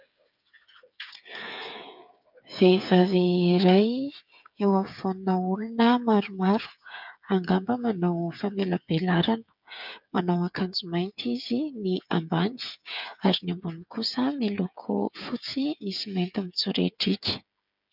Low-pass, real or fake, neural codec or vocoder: 5.4 kHz; fake; codec, 16 kHz, 8 kbps, FreqCodec, smaller model